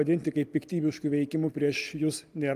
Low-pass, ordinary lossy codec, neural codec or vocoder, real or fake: 14.4 kHz; Opus, 32 kbps; none; real